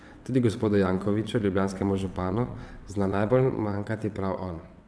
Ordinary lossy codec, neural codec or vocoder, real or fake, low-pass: none; vocoder, 22.05 kHz, 80 mel bands, WaveNeXt; fake; none